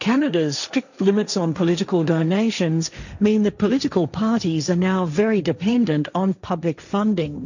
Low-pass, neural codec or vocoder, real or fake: 7.2 kHz; codec, 16 kHz, 1.1 kbps, Voila-Tokenizer; fake